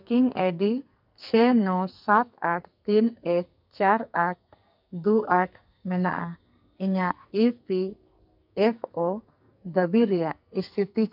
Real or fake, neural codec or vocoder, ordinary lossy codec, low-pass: fake; codec, 44.1 kHz, 2.6 kbps, SNAC; none; 5.4 kHz